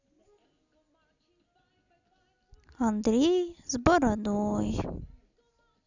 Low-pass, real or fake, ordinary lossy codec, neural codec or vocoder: 7.2 kHz; real; none; none